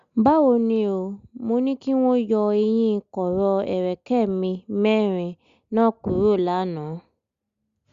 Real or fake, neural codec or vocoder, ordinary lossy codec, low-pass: real; none; Opus, 64 kbps; 7.2 kHz